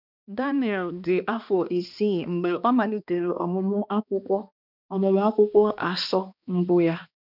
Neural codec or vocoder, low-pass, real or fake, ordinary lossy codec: codec, 16 kHz, 2 kbps, X-Codec, HuBERT features, trained on balanced general audio; 5.4 kHz; fake; none